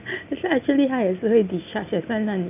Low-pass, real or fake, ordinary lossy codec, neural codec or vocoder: 3.6 kHz; real; none; none